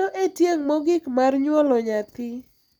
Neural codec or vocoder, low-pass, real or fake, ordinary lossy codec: none; 19.8 kHz; real; none